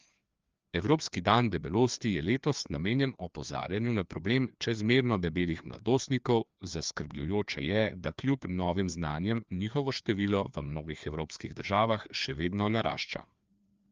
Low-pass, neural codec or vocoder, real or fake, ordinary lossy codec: 7.2 kHz; codec, 16 kHz, 2 kbps, FreqCodec, larger model; fake; Opus, 24 kbps